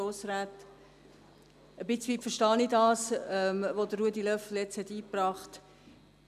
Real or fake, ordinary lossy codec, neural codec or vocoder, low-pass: real; none; none; 14.4 kHz